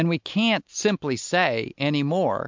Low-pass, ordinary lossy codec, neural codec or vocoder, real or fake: 7.2 kHz; MP3, 64 kbps; none; real